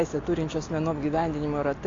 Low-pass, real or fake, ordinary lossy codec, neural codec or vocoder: 7.2 kHz; real; AAC, 32 kbps; none